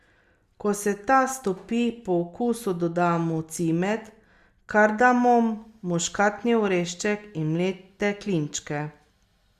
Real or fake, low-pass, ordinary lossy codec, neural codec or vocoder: real; 14.4 kHz; Opus, 64 kbps; none